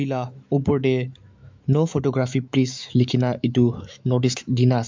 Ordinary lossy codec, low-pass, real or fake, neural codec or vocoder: MP3, 64 kbps; 7.2 kHz; fake; autoencoder, 48 kHz, 128 numbers a frame, DAC-VAE, trained on Japanese speech